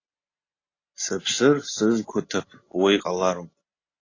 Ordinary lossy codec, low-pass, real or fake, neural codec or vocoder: AAC, 32 kbps; 7.2 kHz; real; none